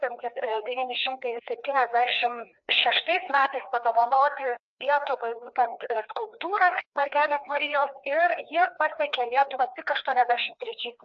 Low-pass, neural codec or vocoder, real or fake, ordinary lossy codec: 7.2 kHz; codec, 16 kHz, 2 kbps, FreqCodec, larger model; fake; AAC, 64 kbps